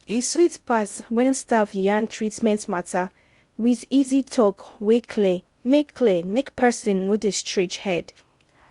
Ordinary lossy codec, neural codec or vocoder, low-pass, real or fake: Opus, 64 kbps; codec, 16 kHz in and 24 kHz out, 0.6 kbps, FocalCodec, streaming, 2048 codes; 10.8 kHz; fake